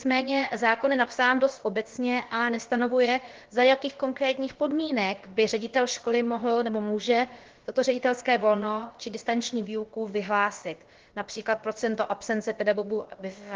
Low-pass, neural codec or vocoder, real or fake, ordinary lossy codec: 7.2 kHz; codec, 16 kHz, about 1 kbps, DyCAST, with the encoder's durations; fake; Opus, 16 kbps